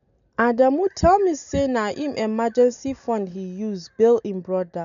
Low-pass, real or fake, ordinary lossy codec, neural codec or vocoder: 7.2 kHz; real; none; none